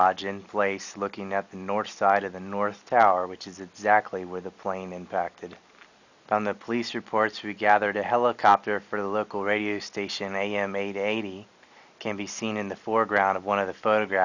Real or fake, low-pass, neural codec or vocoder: real; 7.2 kHz; none